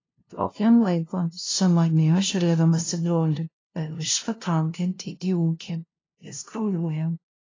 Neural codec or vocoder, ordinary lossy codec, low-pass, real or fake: codec, 16 kHz, 0.5 kbps, FunCodec, trained on LibriTTS, 25 frames a second; AAC, 32 kbps; 7.2 kHz; fake